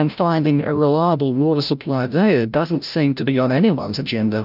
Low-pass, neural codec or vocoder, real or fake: 5.4 kHz; codec, 16 kHz, 0.5 kbps, FreqCodec, larger model; fake